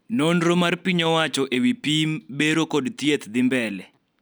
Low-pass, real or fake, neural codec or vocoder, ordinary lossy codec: none; real; none; none